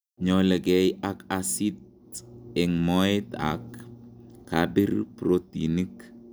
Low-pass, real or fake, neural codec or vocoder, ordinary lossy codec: none; real; none; none